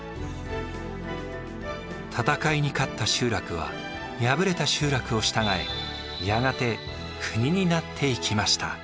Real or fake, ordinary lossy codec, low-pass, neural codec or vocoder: real; none; none; none